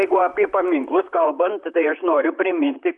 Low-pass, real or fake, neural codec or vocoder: 10.8 kHz; fake; vocoder, 44.1 kHz, 128 mel bands, Pupu-Vocoder